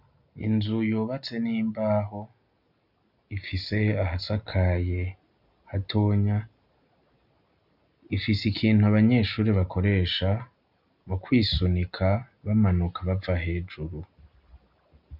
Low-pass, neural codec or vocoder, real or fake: 5.4 kHz; none; real